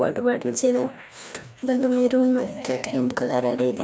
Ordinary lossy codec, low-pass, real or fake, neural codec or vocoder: none; none; fake; codec, 16 kHz, 1 kbps, FreqCodec, larger model